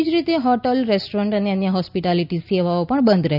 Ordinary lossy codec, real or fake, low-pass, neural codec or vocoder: none; real; 5.4 kHz; none